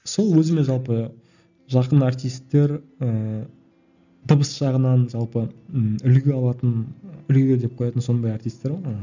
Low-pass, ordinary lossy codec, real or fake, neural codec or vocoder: 7.2 kHz; none; real; none